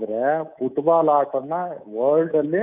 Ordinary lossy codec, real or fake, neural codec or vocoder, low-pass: none; fake; codec, 24 kHz, 3.1 kbps, DualCodec; 3.6 kHz